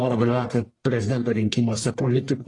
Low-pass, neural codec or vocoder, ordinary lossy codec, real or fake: 10.8 kHz; codec, 44.1 kHz, 1.7 kbps, Pupu-Codec; AAC, 32 kbps; fake